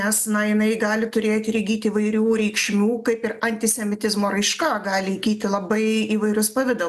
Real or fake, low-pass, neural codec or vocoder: real; 14.4 kHz; none